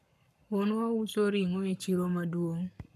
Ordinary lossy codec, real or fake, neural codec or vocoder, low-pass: none; fake; codec, 44.1 kHz, 7.8 kbps, Pupu-Codec; 14.4 kHz